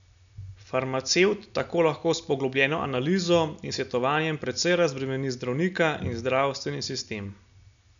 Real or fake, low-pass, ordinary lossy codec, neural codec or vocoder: real; 7.2 kHz; none; none